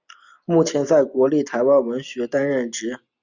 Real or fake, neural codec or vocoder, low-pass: real; none; 7.2 kHz